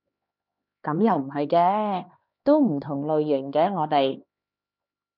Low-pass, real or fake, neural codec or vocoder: 5.4 kHz; fake; codec, 16 kHz, 2 kbps, X-Codec, HuBERT features, trained on LibriSpeech